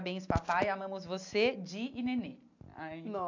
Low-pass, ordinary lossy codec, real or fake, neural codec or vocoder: 7.2 kHz; MP3, 64 kbps; real; none